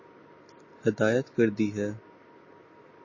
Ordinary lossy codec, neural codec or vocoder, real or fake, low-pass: MP3, 32 kbps; none; real; 7.2 kHz